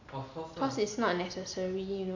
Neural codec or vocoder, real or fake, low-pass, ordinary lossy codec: none; real; 7.2 kHz; AAC, 48 kbps